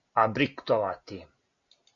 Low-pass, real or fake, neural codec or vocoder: 7.2 kHz; real; none